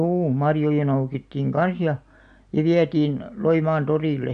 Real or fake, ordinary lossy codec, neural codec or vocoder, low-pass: real; MP3, 64 kbps; none; 9.9 kHz